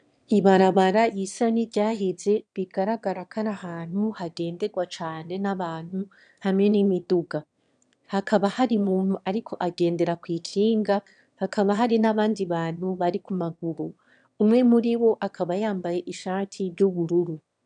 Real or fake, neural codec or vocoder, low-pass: fake; autoencoder, 22.05 kHz, a latent of 192 numbers a frame, VITS, trained on one speaker; 9.9 kHz